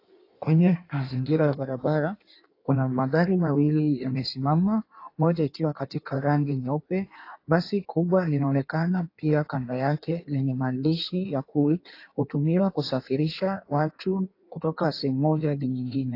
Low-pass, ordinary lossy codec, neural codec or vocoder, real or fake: 5.4 kHz; AAC, 32 kbps; codec, 16 kHz in and 24 kHz out, 1.1 kbps, FireRedTTS-2 codec; fake